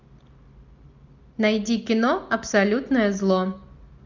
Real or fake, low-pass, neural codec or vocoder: real; 7.2 kHz; none